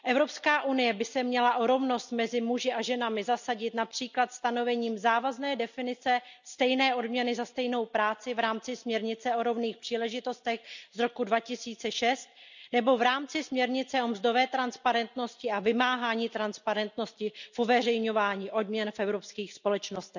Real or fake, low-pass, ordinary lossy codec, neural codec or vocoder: real; 7.2 kHz; none; none